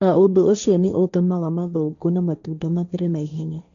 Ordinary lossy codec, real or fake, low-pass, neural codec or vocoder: none; fake; 7.2 kHz; codec, 16 kHz, 1.1 kbps, Voila-Tokenizer